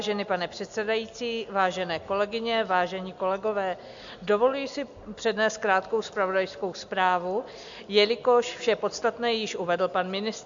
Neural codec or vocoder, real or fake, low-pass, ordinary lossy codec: none; real; 7.2 kHz; AAC, 64 kbps